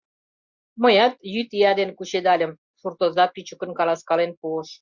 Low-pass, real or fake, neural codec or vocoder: 7.2 kHz; real; none